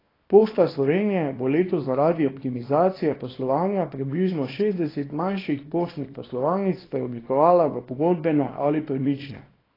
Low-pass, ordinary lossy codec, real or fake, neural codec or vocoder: 5.4 kHz; AAC, 24 kbps; fake; codec, 24 kHz, 0.9 kbps, WavTokenizer, small release